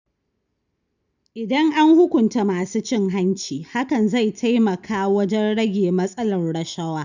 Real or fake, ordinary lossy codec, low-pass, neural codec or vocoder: real; none; 7.2 kHz; none